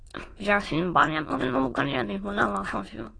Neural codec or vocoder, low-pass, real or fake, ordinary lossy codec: autoencoder, 22.05 kHz, a latent of 192 numbers a frame, VITS, trained on many speakers; 9.9 kHz; fake; AAC, 32 kbps